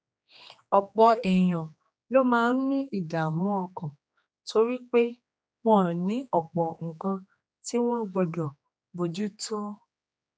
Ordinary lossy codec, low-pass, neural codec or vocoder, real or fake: none; none; codec, 16 kHz, 2 kbps, X-Codec, HuBERT features, trained on general audio; fake